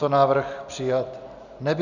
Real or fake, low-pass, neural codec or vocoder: real; 7.2 kHz; none